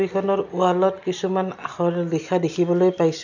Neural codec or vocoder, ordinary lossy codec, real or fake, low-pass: none; none; real; 7.2 kHz